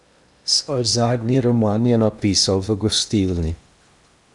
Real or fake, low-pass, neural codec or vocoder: fake; 10.8 kHz; codec, 16 kHz in and 24 kHz out, 0.8 kbps, FocalCodec, streaming, 65536 codes